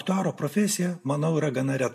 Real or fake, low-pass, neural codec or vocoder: real; 14.4 kHz; none